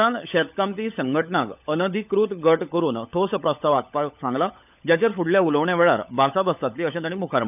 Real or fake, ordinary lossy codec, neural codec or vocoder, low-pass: fake; none; codec, 16 kHz, 16 kbps, FunCodec, trained on LibriTTS, 50 frames a second; 3.6 kHz